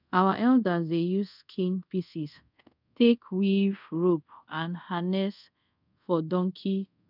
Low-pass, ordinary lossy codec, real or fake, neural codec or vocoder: 5.4 kHz; none; fake; codec, 24 kHz, 0.5 kbps, DualCodec